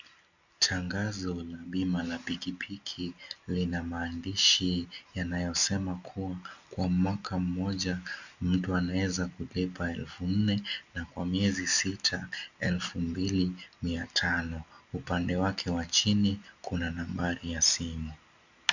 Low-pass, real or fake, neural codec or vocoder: 7.2 kHz; real; none